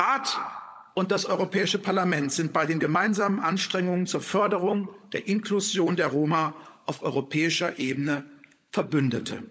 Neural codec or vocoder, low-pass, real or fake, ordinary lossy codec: codec, 16 kHz, 16 kbps, FunCodec, trained on LibriTTS, 50 frames a second; none; fake; none